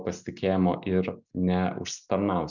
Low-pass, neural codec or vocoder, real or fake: 7.2 kHz; none; real